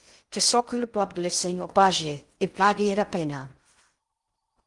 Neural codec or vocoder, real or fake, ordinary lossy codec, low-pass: codec, 16 kHz in and 24 kHz out, 0.6 kbps, FocalCodec, streaming, 2048 codes; fake; Opus, 24 kbps; 10.8 kHz